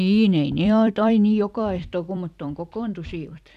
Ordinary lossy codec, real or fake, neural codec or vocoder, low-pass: none; fake; vocoder, 44.1 kHz, 128 mel bands every 256 samples, BigVGAN v2; 14.4 kHz